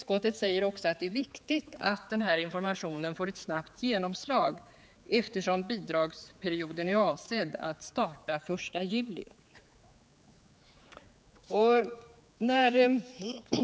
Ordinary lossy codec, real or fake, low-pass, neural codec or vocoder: none; fake; none; codec, 16 kHz, 4 kbps, X-Codec, HuBERT features, trained on general audio